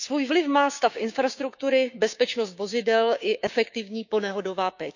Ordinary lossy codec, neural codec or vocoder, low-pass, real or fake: none; codec, 16 kHz, 6 kbps, DAC; 7.2 kHz; fake